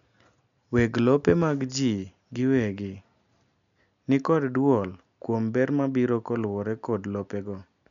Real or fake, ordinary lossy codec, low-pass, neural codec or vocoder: real; none; 7.2 kHz; none